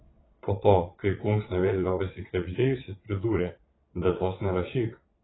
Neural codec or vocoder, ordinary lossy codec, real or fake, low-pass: vocoder, 22.05 kHz, 80 mel bands, Vocos; AAC, 16 kbps; fake; 7.2 kHz